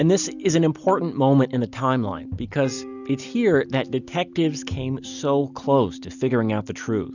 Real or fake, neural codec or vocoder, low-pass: real; none; 7.2 kHz